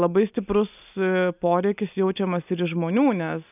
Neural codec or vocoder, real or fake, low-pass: none; real; 3.6 kHz